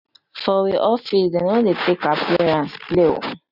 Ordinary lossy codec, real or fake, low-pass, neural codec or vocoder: none; real; 5.4 kHz; none